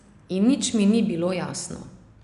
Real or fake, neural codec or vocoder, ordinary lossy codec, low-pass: real; none; none; 10.8 kHz